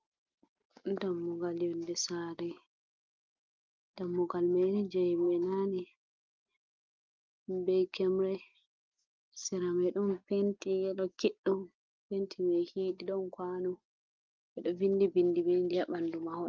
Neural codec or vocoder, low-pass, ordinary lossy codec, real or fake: none; 7.2 kHz; Opus, 32 kbps; real